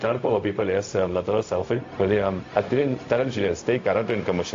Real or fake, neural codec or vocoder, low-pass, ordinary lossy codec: fake; codec, 16 kHz, 0.4 kbps, LongCat-Audio-Codec; 7.2 kHz; AAC, 48 kbps